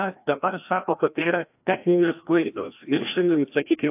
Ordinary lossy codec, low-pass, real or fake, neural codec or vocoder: AAC, 32 kbps; 3.6 kHz; fake; codec, 16 kHz, 1 kbps, FreqCodec, larger model